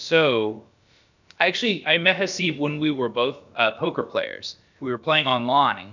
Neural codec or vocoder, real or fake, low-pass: codec, 16 kHz, about 1 kbps, DyCAST, with the encoder's durations; fake; 7.2 kHz